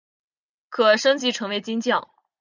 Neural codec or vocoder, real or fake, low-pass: none; real; 7.2 kHz